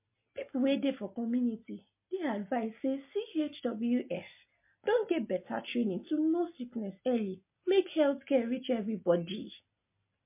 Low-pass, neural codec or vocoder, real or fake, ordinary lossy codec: 3.6 kHz; none; real; MP3, 24 kbps